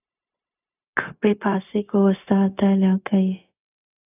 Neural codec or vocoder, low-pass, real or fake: codec, 16 kHz, 0.4 kbps, LongCat-Audio-Codec; 3.6 kHz; fake